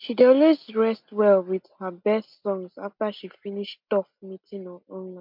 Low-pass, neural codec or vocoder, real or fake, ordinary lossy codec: 5.4 kHz; none; real; MP3, 48 kbps